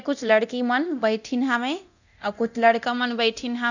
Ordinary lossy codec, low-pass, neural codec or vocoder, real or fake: none; 7.2 kHz; codec, 24 kHz, 0.9 kbps, DualCodec; fake